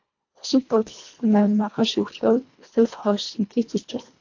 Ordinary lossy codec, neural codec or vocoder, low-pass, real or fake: AAC, 48 kbps; codec, 24 kHz, 1.5 kbps, HILCodec; 7.2 kHz; fake